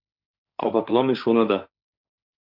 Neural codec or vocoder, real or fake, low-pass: codec, 16 kHz, 1.1 kbps, Voila-Tokenizer; fake; 5.4 kHz